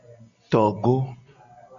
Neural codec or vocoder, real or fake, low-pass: none; real; 7.2 kHz